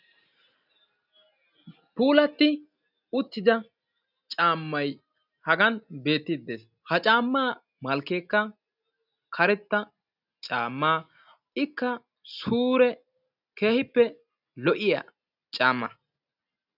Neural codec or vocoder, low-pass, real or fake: none; 5.4 kHz; real